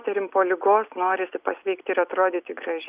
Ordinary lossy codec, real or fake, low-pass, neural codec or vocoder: Opus, 64 kbps; real; 3.6 kHz; none